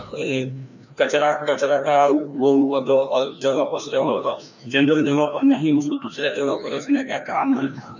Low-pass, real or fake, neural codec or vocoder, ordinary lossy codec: 7.2 kHz; fake; codec, 16 kHz, 1 kbps, FreqCodec, larger model; none